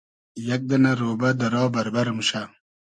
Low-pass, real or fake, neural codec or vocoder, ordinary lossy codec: 9.9 kHz; real; none; MP3, 64 kbps